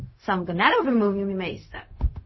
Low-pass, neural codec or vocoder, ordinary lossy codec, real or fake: 7.2 kHz; codec, 16 kHz, 0.4 kbps, LongCat-Audio-Codec; MP3, 24 kbps; fake